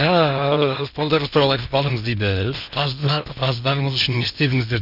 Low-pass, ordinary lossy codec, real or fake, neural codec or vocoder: 5.4 kHz; none; fake; codec, 16 kHz in and 24 kHz out, 0.8 kbps, FocalCodec, streaming, 65536 codes